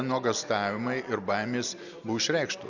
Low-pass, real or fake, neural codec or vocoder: 7.2 kHz; real; none